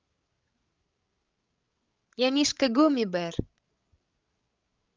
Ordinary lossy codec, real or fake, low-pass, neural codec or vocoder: Opus, 32 kbps; fake; 7.2 kHz; codec, 16 kHz, 16 kbps, FreqCodec, larger model